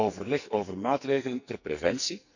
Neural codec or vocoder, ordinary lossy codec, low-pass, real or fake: codec, 44.1 kHz, 2.6 kbps, SNAC; none; 7.2 kHz; fake